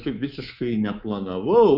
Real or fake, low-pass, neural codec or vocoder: fake; 5.4 kHz; codec, 24 kHz, 3.1 kbps, DualCodec